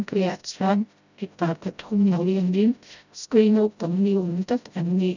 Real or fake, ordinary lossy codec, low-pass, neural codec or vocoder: fake; none; 7.2 kHz; codec, 16 kHz, 0.5 kbps, FreqCodec, smaller model